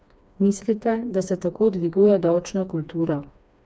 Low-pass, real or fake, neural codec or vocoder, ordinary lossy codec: none; fake; codec, 16 kHz, 2 kbps, FreqCodec, smaller model; none